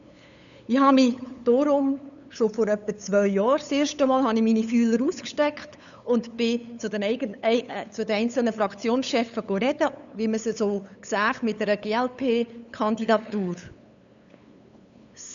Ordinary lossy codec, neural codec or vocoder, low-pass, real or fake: none; codec, 16 kHz, 8 kbps, FunCodec, trained on LibriTTS, 25 frames a second; 7.2 kHz; fake